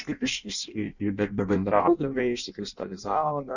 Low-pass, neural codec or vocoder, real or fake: 7.2 kHz; codec, 16 kHz in and 24 kHz out, 0.6 kbps, FireRedTTS-2 codec; fake